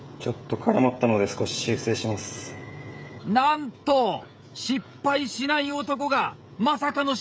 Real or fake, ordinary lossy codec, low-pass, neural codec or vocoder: fake; none; none; codec, 16 kHz, 16 kbps, FreqCodec, smaller model